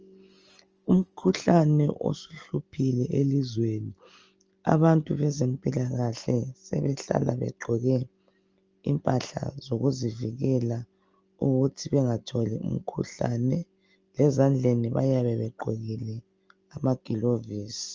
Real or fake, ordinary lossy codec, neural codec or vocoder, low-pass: real; Opus, 24 kbps; none; 7.2 kHz